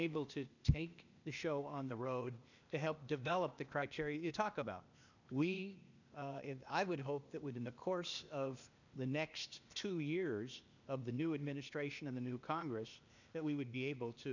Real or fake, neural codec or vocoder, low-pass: fake; codec, 16 kHz, 0.8 kbps, ZipCodec; 7.2 kHz